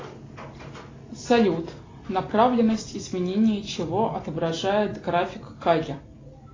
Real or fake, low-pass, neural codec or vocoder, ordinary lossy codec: real; 7.2 kHz; none; AAC, 32 kbps